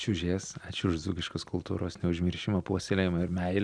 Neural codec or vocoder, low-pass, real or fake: none; 9.9 kHz; real